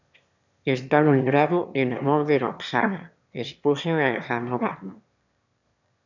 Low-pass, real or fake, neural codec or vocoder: 7.2 kHz; fake; autoencoder, 22.05 kHz, a latent of 192 numbers a frame, VITS, trained on one speaker